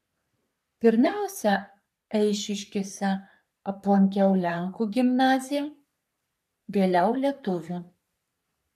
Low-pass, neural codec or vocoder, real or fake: 14.4 kHz; codec, 44.1 kHz, 3.4 kbps, Pupu-Codec; fake